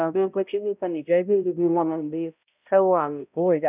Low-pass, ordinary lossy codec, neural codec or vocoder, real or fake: 3.6 kHz; none; codec, 16 kHz, 0.5 kbps, X-Codec, HuBERT features, trained on balanced general audio; fake